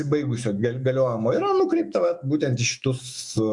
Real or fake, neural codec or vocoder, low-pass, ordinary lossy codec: fake; autoencoder, 48 kHz, 128 numbers a frame, DAC-VAE, trained on Japanese speech; 10.8 kHz; Opus, 64 kbps